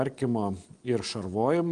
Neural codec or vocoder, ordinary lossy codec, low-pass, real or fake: none; Opus, 24 kbps; 9.9 kHz; real